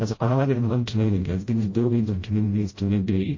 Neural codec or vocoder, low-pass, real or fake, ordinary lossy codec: codec, 16 kHz, 0.5 kbps, FreqCodec, smaller model; 7.2 kHz; fake; MP3, 32 kbps